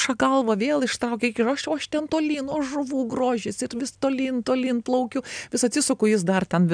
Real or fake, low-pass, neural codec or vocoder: real; 9.9 kHz; none